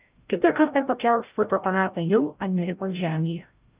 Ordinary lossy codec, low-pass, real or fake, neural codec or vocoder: Opus, 24 kbps; 3.6 kHz; fake; codec, 16 kHz, 0.5 kbps, FreqCodec, larger model